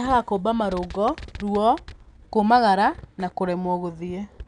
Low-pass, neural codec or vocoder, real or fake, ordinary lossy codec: 9.9 kHz; none; real; none